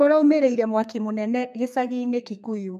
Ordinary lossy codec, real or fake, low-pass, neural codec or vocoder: none; fake; 14.4 kHz; codec, 32 kHz, 1.9 kbps, SNAC